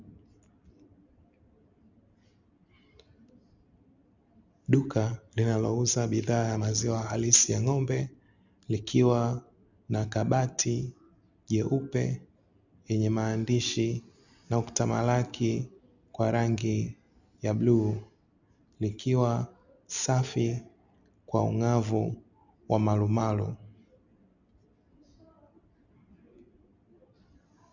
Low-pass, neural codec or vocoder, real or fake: 7.2 kHz; none; real